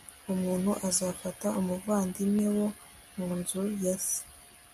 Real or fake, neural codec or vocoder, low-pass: real; none; 14.4 kHz